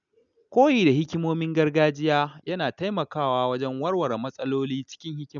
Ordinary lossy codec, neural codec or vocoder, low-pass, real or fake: none; none; 7.2 kHz; real